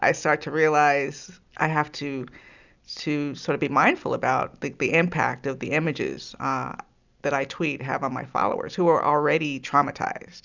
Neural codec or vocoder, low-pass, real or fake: none; 7.2 kHz; real